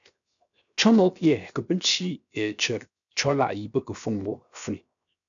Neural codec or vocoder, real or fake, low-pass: codec, 16 kHz, 0.7 kbps, FocalCodec; fake; 7.2 kHz